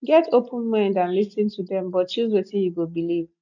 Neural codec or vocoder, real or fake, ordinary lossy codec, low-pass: none; real; none; 7.2 kHz